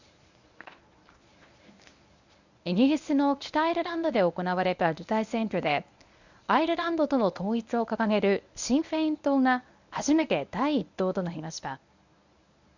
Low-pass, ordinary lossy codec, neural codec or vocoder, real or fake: 7.2 kHz; none; codec, 24 kHz, 0.9 kbps, WavTokenizer, medium speech release version 1; fake